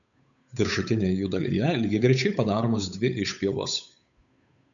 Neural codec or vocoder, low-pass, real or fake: codec, 16 kHz, 8 kbps, FunCodec, trained on Chinese and English, 25 frames a second; 7.2 kHz; fake